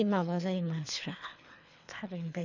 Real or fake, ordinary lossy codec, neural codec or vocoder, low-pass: fake; none; codec, 24 kHz, 3 kbps, HILCodec; 7.2 kHz